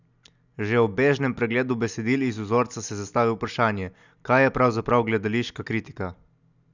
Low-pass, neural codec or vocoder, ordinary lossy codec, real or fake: 7.2 kHz; none; none; real